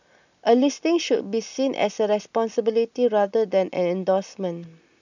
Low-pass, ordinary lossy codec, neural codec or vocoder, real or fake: 7.2 kHz; none; none; real